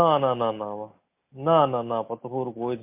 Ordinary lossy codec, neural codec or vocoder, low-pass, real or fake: MP3, 32 kbps; none; 3.6 kHz; real